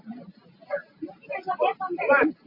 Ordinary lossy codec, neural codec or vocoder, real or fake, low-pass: MP3, 32 kbps; none; real; 5.4 kHz